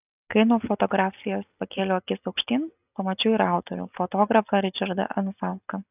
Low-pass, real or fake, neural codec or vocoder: 3.6 kHz; real; none